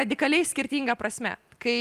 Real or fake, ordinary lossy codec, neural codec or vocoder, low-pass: real; Opus, 32 kbps; none; 14.4 kHz